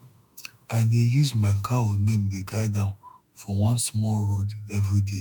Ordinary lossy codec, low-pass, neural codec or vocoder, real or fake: none; 19.8 kHz; autoencoder, 48 kHz, 32 numbers a frame, DAC-VAE, trained on Japanese speech; fake